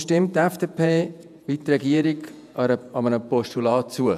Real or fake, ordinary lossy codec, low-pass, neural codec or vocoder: fake; none; 14.4 kHz; vocoder, 48 kHz, 128 mel bands, Vocos